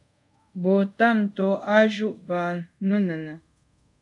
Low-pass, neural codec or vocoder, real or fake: 10.8 kHz; codec, 24 kHz, 0.5 kbps, DualCodec; fake